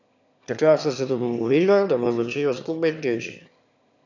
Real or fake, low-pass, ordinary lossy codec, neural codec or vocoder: fake; 7.2 kHz; none; autoencoder, 22.05 kHz, a latent of 192 numbers a frame, VITS, trained on one speaker